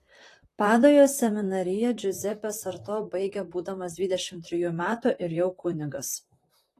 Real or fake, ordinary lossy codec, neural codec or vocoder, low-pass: fake; AAC, 48 kbps; vocoder, 44.1 kHz, 128 mel bands, Pupu-Vocoder; 14.4 kHz